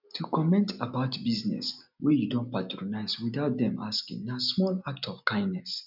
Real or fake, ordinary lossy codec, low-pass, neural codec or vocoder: real; none; 5.4 kHz; none